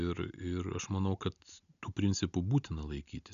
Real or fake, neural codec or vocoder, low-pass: real; none; 7.2 kHz